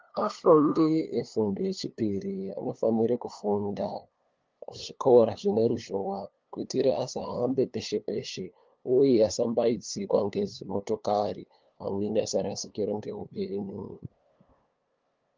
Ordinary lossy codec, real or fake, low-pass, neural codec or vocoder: Opus, 32 kbps; fake; 7.2 kHz; codec, 16 kHz, 2 kbps, FunCodec, trained on LibriTTS, 25 frames a second